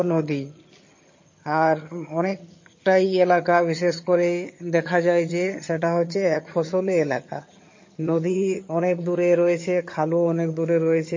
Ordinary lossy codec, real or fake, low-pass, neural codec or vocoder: MP3, 32 kbps; fake; 7.2 kHz; vocoder, 22.05 kHz, 80 mel bands, HiFi-GAN